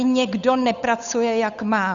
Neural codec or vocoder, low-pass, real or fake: codec, 16 kHz, 8 kbps, FunCodec, trained on Chinese and English, 25 frames a second; 7.2 kHz; fake